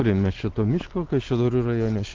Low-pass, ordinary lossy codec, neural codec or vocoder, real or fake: 7.2 kHz; Opus, 16 kbps; none; real